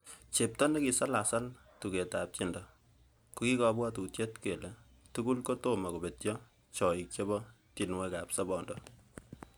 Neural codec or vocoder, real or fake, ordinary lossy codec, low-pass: none; real; none; none